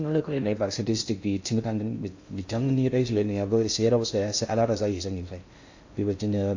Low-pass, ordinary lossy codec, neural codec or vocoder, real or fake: 7.2 kHz; AAC, 48 kbps; codec, 16 kHz in and 24 kHz out, 0.6 kbps, FocalCodec, streaming, 2048 codes; fake